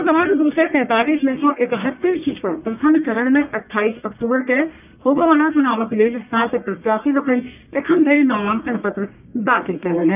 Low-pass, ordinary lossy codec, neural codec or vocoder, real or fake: 3.6 kHz; none; codec, 44.1 kHz, 1.7 kbps, Pupu-Codec; fake